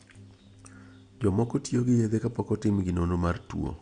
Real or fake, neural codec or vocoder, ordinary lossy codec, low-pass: real; none; MP3, 96 kbps; 9.9 kHz